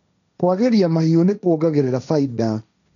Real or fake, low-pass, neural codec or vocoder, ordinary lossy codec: fake; 7.2 kHz; codec, 16 kHz, 1.1 kbps, Voila-Tokenizer; none